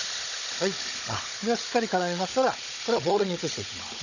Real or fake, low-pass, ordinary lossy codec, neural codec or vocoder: fake; 7.2 kHz; none; codec, 16 kHz, 16 kbps, FunCodec, trained on Chinese and English, 50 frames a second